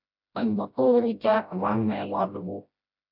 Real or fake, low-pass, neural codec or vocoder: fake; 5.4 kHz; codec, 16 kHz, 0.5 kbps, FreqCodec, smaller model